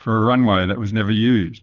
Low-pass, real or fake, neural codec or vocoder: 7.2 kHz; fake; codec, 24 kHz, 6 kbps, HILCodec